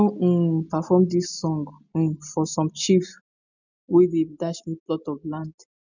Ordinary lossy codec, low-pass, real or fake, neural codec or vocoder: none; 7.2 kHz; real; none